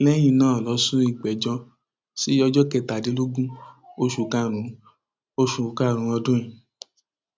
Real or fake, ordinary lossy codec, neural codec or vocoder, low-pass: real; none; none; none